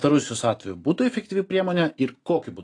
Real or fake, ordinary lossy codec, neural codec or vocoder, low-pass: real; AAC, 48 kbps; none; 10.8 kHz